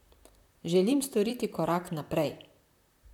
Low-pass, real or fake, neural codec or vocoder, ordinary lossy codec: 19.8 kHz; fake; vocoder, 44.1 kHz, 128 mel bands, Pupu-Vocoder; none